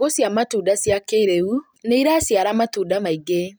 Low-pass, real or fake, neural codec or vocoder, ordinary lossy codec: none; real; none; none